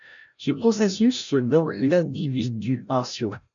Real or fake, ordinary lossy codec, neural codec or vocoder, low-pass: fake; MP3, 96 kbps; codec, 16 kHz, 0.5 kbps, FreqCodec, larger model; 7.2 kHz